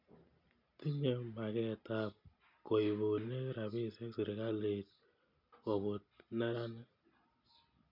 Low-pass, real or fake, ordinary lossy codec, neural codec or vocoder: 5.4 kHz; real; none; none